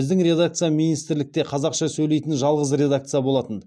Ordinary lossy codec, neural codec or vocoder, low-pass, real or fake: none; none; none; real